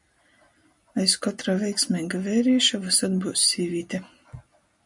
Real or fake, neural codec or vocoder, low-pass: real; none; 10.8 kHz